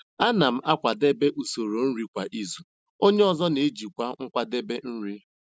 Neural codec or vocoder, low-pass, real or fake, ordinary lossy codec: none; none; real; none